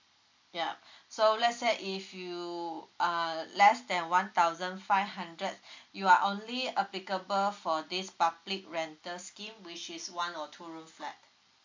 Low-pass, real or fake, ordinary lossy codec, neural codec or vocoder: 7.2 kHz; real; MP3, 64 kbps; none